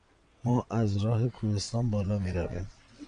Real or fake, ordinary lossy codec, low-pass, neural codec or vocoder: fake; MP3, 64 kbps; 9.9 kHz; vocoder, 22.05 kHz, 80 mel bands, WaveNeXt